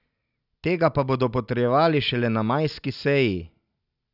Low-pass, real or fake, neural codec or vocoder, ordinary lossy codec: 5.4 kHz; real; none; none